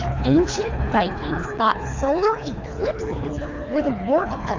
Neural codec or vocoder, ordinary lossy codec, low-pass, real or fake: codec, 24 kHz, 3 kbps, HILCodec; AAC, 48 kbps; 7.2 kHz; fake